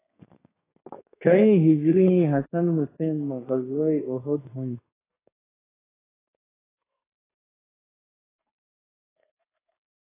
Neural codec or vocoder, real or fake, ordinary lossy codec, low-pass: codec, 32 kHz, 1.9 kbps, SNAC; fake; AAC, 16 kbps; 3.6 kHz